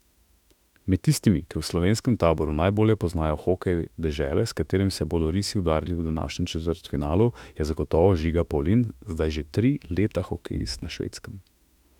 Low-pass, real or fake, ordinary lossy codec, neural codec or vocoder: 19.8 kHz; fake; none; autoencoder, 48 kHz, 32 numbers a frame, DAC-VAE, trained on Japanese speech